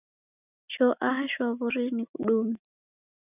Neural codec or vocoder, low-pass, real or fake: none; 3.6 kHz; real